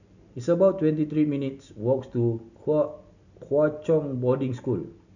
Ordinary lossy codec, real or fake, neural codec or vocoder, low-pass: none; real; none; 7.2 kHz